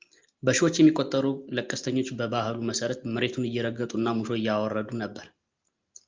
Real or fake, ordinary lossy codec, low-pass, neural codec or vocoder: real; Opus, 32 kbps; 7.2 kHz; none